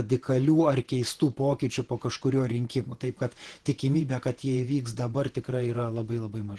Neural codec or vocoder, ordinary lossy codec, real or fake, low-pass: vocoder, 48 kHz, 128 mel bands, Vocos; Opus, 16 kbps; fake; 10.8 kHz